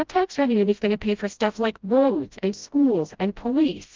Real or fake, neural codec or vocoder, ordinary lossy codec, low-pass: fake; codec, 16 kHz, 0.5 kbps, FreqCodec, smaller model; Opus, 24 kbps; 7.2 kHz